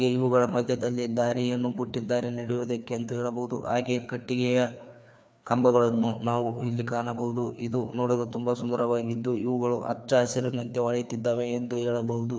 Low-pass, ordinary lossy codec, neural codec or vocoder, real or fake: none; none; codec, 16 kHz, 2 kbps, FreqCodec, larger model; fake